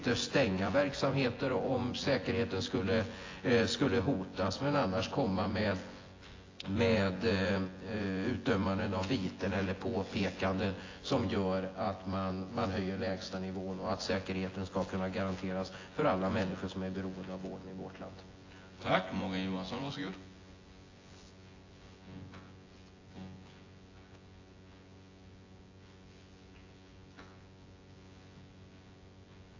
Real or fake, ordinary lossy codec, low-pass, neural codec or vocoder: fake; AAC, 32 kbps; 7.2 kHz; vocoder, 24 kHz, 100 mel bands, Vocos